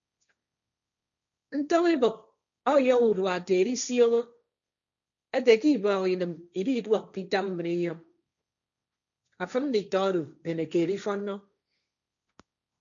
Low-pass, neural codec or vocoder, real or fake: 7.2 kHz; codec, 16 kHz, 1.1 kbps, Voila-Tokenizer; fake